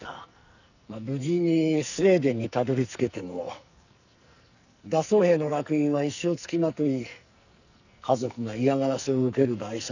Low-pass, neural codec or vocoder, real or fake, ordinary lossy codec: 7.2 kHz; codec, 44.1 kHz, 2.6 kbps, SNAC; fake; none